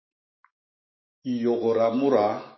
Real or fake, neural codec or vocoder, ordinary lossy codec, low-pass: fake; autoencoder, 48 kHz, 128 numbers a frame, DAC-VAE, trained on Japanese speech; MP3, 24 kbps; 7.2 kHz